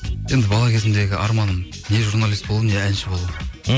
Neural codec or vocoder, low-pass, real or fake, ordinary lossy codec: none; none; real; none